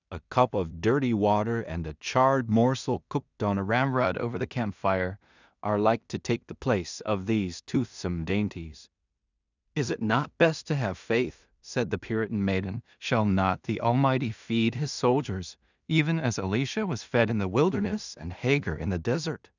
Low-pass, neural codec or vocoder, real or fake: 7.2 kHz; codec, 16 kHz in and 24 kHz out, 0.4 kbps, LongCat-Audio-Codec, two codebook decoder; fake